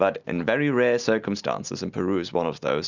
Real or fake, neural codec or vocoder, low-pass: real; none; 7.2 kHz